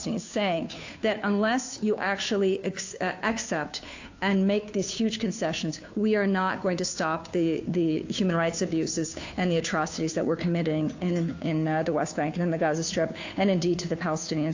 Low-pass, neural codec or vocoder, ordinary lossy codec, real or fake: 7.2 kHz; codec, 16 kHz, 2 kbps, FunCodec, trained on Chinese and English, 25 frames a second; AAC, 48 kbps; fake